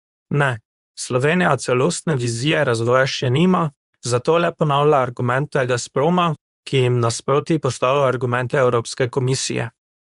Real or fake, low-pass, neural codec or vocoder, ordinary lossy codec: fake; 10.8 kHz; codec, 24 kHz, 0.9 kbps, WavTokenizer, medium speech release version 2; none